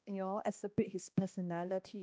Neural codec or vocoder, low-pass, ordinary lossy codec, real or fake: codec, 16 kHz, 1 kbps, X-Codec, HuBERT features, trained on balanced general audio; none; none; fake